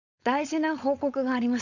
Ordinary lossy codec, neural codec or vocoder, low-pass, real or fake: none; codec, 16 kHz, 4.8 kbps, FACodec; 7.2 kHz; fake